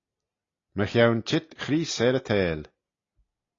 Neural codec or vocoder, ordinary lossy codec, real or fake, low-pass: none; AAC, 32 kbps; real; 7.2 kHz